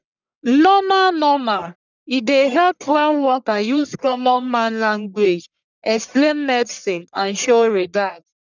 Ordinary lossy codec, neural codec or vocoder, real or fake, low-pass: none; codec, 44.1 kHz, 1.7 kbps, Pupu-Codec; fake; 7.2 kHz